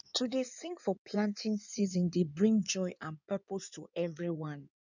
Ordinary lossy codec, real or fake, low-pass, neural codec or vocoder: none; fake; 7.2 kHz; codec, 16 kHz in and 24 kHz out, 2.2 kbps, FireRedTTS-2 codec